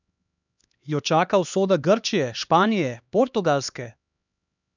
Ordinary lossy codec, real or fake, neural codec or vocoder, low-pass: none; fake; codec, 16 kHz, 2 kbps, X-Codec, HuBERT features, trained on LibriSpeech; 7.2 kHz